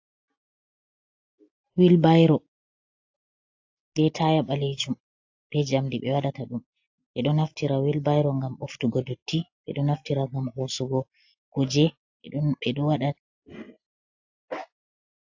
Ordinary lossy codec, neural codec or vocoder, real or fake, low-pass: AAC, 48 kbps; none; real; 7.2 kHz